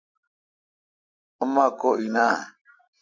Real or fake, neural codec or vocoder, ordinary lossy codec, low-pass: real; none; MP3, 48 kbps; 7.2 kHz